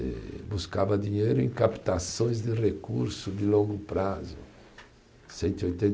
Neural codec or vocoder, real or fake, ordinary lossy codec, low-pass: none; real; none; none